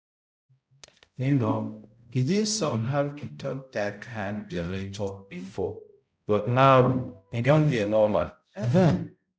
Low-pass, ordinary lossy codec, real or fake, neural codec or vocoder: none; none; fake; codec, 16 kHz, 0.5 kbps, X-Codec, HuBERT features, trained on balanced general audio